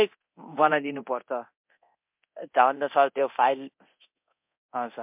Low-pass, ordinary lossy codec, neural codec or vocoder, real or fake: 3.6 kHz; MP3, 32 kbps; codec, 24 kHz, 0.9 kbps, DualCodec; fake